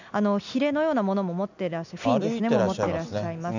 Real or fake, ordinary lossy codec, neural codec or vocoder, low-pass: real; none; none; 7.2 kHz